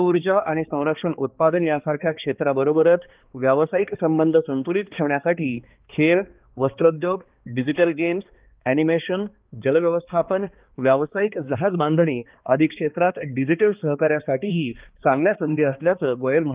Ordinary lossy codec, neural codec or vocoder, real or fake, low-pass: Opus, 32 kbps; codec, 16 kHz, 2 kbps, X-Codec, HuBERT features, trained on balanced general audio; fake; 3.6 kHz